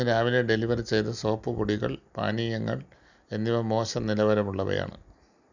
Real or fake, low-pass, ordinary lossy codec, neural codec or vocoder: real; 7.2 kHz; none; none